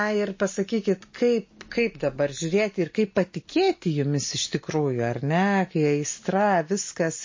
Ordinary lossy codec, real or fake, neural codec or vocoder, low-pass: MP3, 32 kbps; real; none; 7.2 kHz